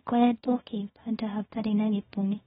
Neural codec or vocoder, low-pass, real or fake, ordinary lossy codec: codec, 24 kHz, 0.9 kbps, WavTokenizer, small release; 10.8 kHz; fake; AAC, 16 kbps